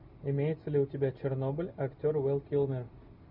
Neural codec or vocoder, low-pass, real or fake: none; 5.4 kHz; real